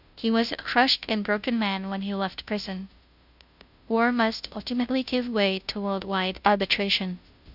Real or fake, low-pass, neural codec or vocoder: fake; 5.4 kHz; codec, 16 kHz, 0.5 kbps, FunCodec, trained on Chinese and English, 25 frames a second